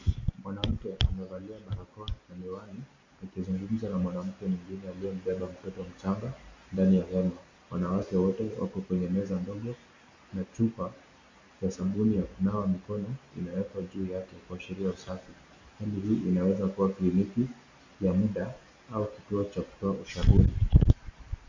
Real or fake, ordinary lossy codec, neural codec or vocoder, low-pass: real; MP3, 48 kbps; none; 7.2 kHz